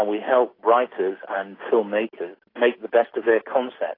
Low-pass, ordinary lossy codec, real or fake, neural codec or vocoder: 5.4 kHz; AAC, 24 kbps; real; none